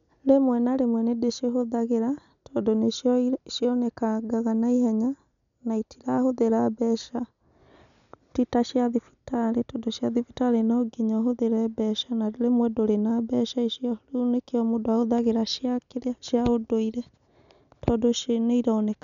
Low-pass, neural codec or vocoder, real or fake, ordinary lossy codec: 7.2 kHz; none; real; none